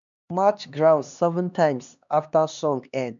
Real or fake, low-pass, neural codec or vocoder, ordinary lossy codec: fake; 7.2 kHz; codec, 16 kHz, 2 kbps, X-Codec, HuBERT features, trained on LibriSpeech; none